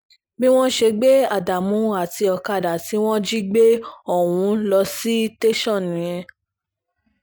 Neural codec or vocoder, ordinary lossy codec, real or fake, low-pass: none; none; real; none